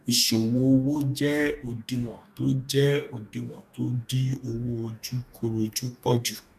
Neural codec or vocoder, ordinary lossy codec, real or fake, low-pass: codec, 44.1 kHz, 2.6 kbps, DAC; none; fake; 14.4 kHz